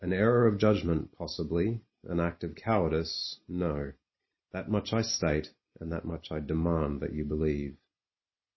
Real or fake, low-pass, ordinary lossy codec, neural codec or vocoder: real; 7.2 kHz; MP3, 24 kbps; none